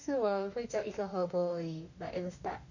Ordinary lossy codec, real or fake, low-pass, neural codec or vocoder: none; fake; 7.2 kHz; autoencoder, 48 kHz, 32 numbers a frame, DAC-VAE, trained on Japanese speech